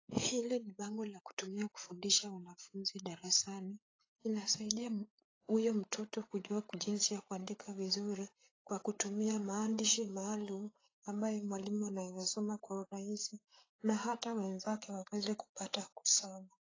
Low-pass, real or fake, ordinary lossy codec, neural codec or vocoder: 7.2 kHz; fake; AAC, 32 kbps; codec, 16 kHz, 8 kbps, FreqCodec, larger model